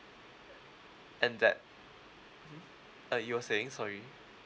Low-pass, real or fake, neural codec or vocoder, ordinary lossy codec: none; real; none; none